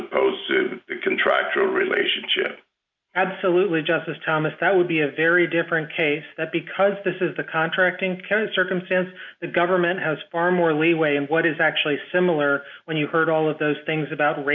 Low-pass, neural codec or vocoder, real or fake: 7.2 kHz; none; real